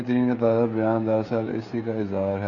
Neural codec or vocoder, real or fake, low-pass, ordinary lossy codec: codec, 16 kHz, 16 kbps, FreqCodec, smaller model; fake; 7.2 kHz; Opus, 64 kbps